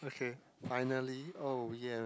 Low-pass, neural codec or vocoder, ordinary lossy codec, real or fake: none; none; none; real